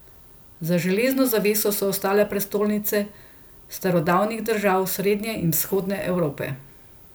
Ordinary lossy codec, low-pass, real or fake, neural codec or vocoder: none; none; real; none